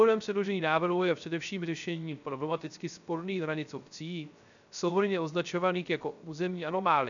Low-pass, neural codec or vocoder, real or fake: 7.2 kHz; codec, 16 kHz, 0.3 kbps, FocalCodec; fake